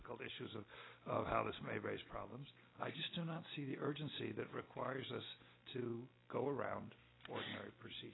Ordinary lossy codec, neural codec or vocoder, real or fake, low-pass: AAC, 16 kbps; none; real; 7.2 kHz